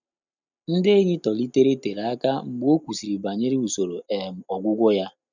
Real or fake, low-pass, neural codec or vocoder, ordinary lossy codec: real; 7.2 kHz; none; none